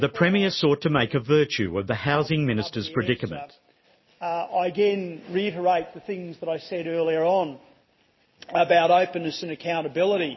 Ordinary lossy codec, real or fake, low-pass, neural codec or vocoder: MP3, 24 kbps; real; 7.2 kHz; none